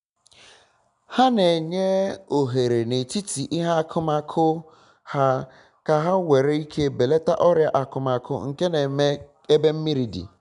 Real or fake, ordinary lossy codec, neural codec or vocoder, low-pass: real; none; none; 10.8 kHz